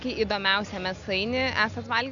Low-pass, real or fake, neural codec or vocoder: 7.2 kHz; real; none